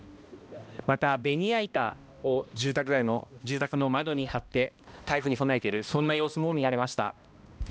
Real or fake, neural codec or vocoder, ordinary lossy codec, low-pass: fake; codec, 16 kHz, 1 kbps, X-Codec, HuBERT features, trained on balanced general audio; none; none